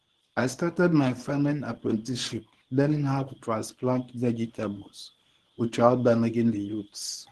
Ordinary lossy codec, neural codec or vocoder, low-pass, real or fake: Opus, 16 kbps; codec, 24 kHz, 0.9 kbps, WavTokenizer, medium speech release version 1; 10.8 kHz; fake